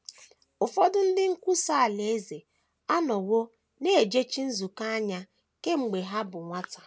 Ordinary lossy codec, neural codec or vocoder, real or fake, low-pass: none; none; real; none